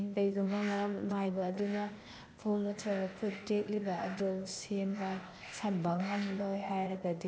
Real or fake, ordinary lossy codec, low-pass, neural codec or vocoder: fake; none; none; codec, 16 kHz, 0.8 kbps, ZipCodec